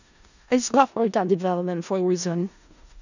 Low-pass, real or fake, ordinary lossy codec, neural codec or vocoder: 7.2 kHz; fake; none; codec, 16 kHz in and 24 kHz out, 0.4 kbps, LongCat-Audio-Codec, four codebook decoder